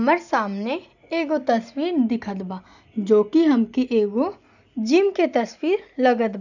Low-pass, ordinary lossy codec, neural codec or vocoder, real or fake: 7.2 kHz; none; none; real